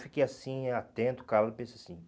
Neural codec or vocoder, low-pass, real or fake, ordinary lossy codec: none; none; real; none